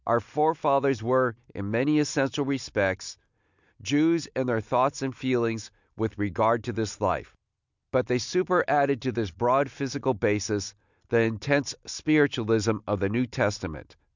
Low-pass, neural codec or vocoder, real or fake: 7.2 kHz; none; real